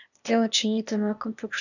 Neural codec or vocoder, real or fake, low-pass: codec, 16 kHz, 0.8 kbps, ZipCodec; fake; 7.2 kHz